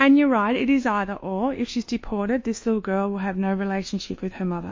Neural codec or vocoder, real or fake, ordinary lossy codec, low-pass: codec, 24 kHz, 1.2 kbps, DualCodec; fake; MP3, 32 kbps; 7.2 kHz